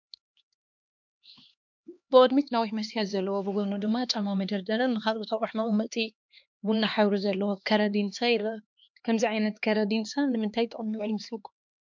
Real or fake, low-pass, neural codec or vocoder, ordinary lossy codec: fake; 7.2 kHz; codec, 16 kHz, 2 kbps, X-Codec, HuBERT features, trained on LibriSpeech; MP3, 64 kbps